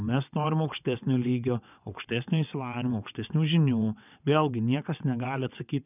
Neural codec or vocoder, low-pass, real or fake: vocoder, 22.05 kHz, 80 mel bands, WaveNeXt; 3.6 kHz; fake